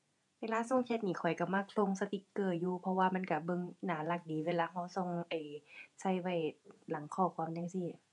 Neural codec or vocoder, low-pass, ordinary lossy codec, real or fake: vocoder, 48 kHz, 128 mel bands, Vocos; 10.8 kHz; none; fake